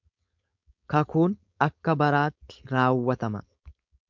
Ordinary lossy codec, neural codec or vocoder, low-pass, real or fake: MP3, 64 kbps; codec, 16 kHz, 4.8 kbps, FACodec; 7.2 kHz; fake